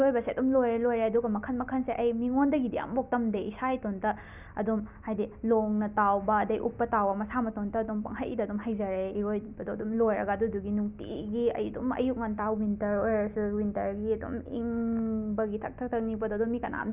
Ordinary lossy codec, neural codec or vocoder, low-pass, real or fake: none; none; 3.6 kHz; real